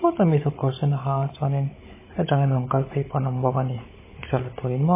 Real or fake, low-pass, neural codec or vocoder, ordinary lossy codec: fake; 3.6 kHz; codec, 16 kHz, 16 kbps, FunCodec, trained on Chinese and English, 50 frames a second; MP3, 16 kbps